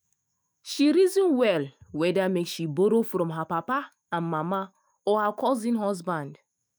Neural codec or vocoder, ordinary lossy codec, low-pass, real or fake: autoencoder, 48 kHz, 128 numbers a frame, DAC-VAE, trained on Japanese speech; none; none; fake